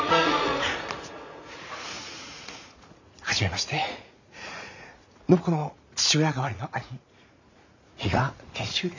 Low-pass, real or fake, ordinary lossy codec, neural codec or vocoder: 7.2 kHz; fake; none; vocoder, 44.1 kHz, 80 mel bands, Vocos